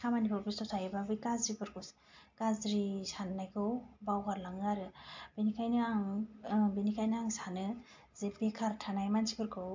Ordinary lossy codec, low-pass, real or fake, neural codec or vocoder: MP3, 48 kbps; 7.2 kHz; real; none